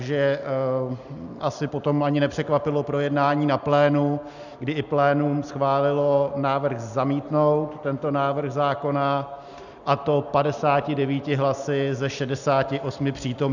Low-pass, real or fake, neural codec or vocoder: 7.2 kHz; real; none